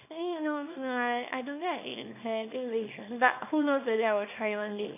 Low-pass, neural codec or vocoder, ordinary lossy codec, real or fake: 3.6 kHz; codec, 24 kHz, 0.9 kbps, WavTokenizer, small release; none; fake